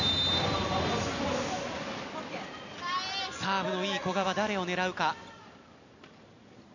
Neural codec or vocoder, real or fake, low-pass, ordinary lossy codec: none; real; 7.2 kHz; none